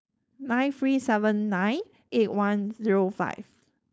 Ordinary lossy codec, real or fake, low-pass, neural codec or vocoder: none; fake; none; codec, 16 kHz, 4.8 kbps, FACodec